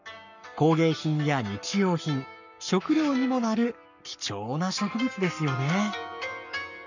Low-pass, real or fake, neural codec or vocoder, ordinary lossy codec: 7.2 kHz; fake; codec, 44.1 kHz, 7.8 kbps, Pupu-Codec; none